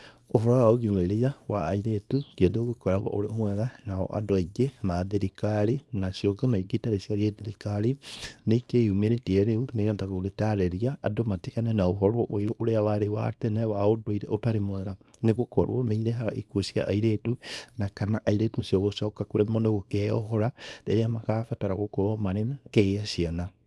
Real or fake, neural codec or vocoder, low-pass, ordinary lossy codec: fake; codec, 24 kHz, 0.9 kbps, WavTokenizer, small release; none; none